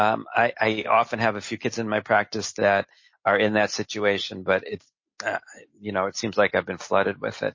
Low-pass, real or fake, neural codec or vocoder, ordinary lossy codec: 7.2 kHz; real; none; MP3, 32 kbps